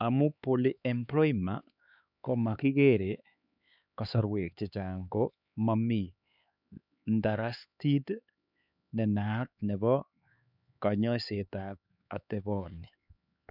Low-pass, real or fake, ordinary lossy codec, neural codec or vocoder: 5.4 kHz; fake; none; codec, 16 kHz, 4 kbps, X-Codec, HuBERT features, trained on LibriSpeech